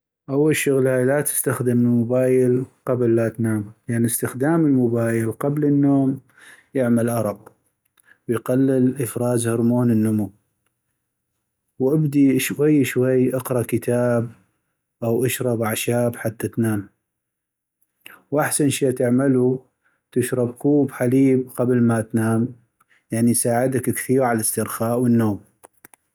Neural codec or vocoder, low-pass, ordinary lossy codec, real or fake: none; none; none; real